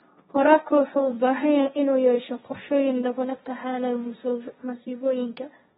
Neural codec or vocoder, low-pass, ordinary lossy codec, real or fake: codec, 16 kHz, 1.1 kbps, Voila-Tokenizer; 7.2 kHz; AAC, 16 kbps; fake